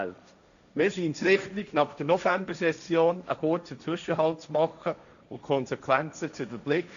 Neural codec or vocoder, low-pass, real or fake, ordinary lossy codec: codec, 16 kHz, 1.1 kbps, Voila-Tokenizer; 7.2 kHz; fake; none